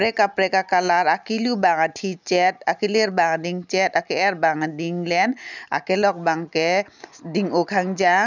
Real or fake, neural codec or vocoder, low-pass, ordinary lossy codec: real; none; 7.2 kHz; none